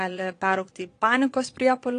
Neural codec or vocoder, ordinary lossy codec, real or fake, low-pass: vocoder, 22.05 kHz, 80 mel bands, Vocos; AAC, 48 kbps; fake; 9.9 kHz